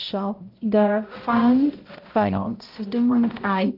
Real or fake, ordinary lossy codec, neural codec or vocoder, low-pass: fake; Opus, 32 kbps; codec, 16 kHz, 0.5 kbps, X-Codec, HuBERT features, trained on balanced general audio; 5.4 kHz